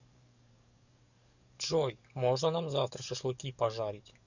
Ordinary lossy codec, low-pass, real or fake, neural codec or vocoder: none; 7.2 kHz; fake; codec, 44.1 kHz, 7.8 kbps, DAC